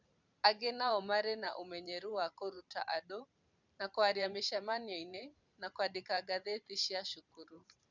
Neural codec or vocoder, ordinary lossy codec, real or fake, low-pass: vocoder, 44.1 kHz, 128 mel bands every 512 samples, BigVGAN v2; none; fake; 7.2 kHz